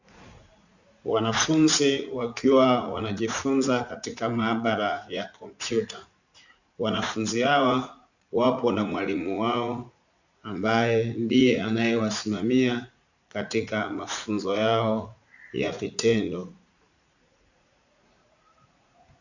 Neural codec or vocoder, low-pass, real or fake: vocoder, 44.1 kHz, 80 mel bands, Vocos; 7.2 kHz; fake